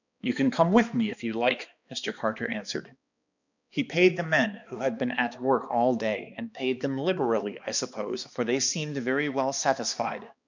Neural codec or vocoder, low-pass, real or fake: codec, 16 kHz, 2 kbps, X-Codec, HuBERT features, trained on balanced general audio; 7.2 kHz; fake